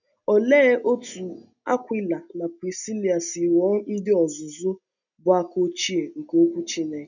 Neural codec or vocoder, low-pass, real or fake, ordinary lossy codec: none; 7.2 kHz; real; none